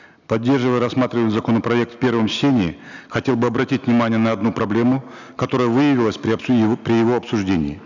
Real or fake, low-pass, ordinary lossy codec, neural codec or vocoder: real; 7.2 kHz; none; none